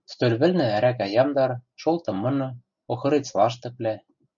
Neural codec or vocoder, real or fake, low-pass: none; real; 7.2 kHz